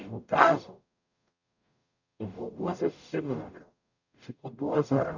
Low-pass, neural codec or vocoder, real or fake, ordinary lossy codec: 7.2 kHz; codec, 44.1 kHz, 0.9 kbps, DAC; fake; none